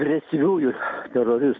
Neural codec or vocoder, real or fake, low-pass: none; real; 7.2 kHz